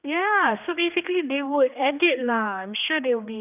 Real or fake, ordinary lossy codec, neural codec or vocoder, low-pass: fake; none; codec, 16 kHz, 2 kbps, X-Codec, HuBERT features, trained on general audio; 3.6 kHz